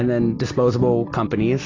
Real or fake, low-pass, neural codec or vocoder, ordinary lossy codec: real; 7.2 kHz; none; AAC, 32 kbps